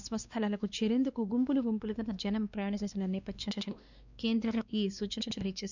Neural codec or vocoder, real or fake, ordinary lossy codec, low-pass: codec, 16 kHz, 2 kbps, X-Codec, WavLM features, trained on Multilingual LibriSpeech; fake; none; 7.2 kHz